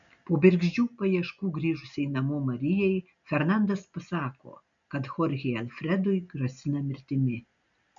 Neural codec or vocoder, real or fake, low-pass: none; real; 7.2 kHz